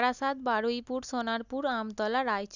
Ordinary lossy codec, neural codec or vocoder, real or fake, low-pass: none; none; real; 7.2 kHz